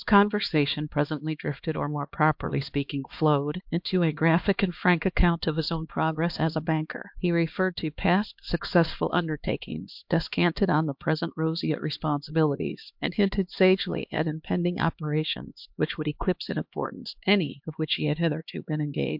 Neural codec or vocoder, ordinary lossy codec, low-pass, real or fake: codec, 16 kHz, 2 kbps, X-Codec, HuBERT features, trained on LibriSpeech; MP3, 48 kbps; 5.4 kHz; fake